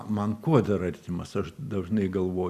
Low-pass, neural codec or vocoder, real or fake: 14.4 kHz; none; real